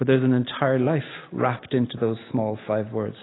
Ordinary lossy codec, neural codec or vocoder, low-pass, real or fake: AAC, 16 kbps; none; 7.2 kHz; real